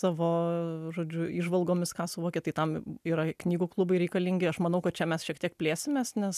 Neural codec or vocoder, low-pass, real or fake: none; 14.4 kHz; real